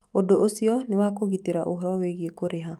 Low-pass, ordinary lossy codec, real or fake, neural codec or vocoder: 19.8 kHz; Opus, 32 kbps; fake; autoencoder, 48 kHz, 128 numbers a frame, DAC-VAE, trained on Japanese speech